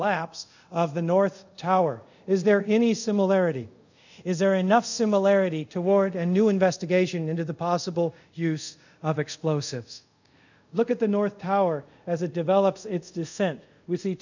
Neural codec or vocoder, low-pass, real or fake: codec, 24 kHz, 0.5 kbps, DualCodec; 7.2 kHz; fake